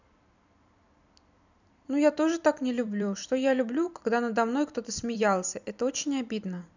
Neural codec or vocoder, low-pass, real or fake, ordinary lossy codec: vocoder, 44.1 kHz, 128 mel bands every 256 samples, BigVGAN v2; 7.2 kHz; fake; none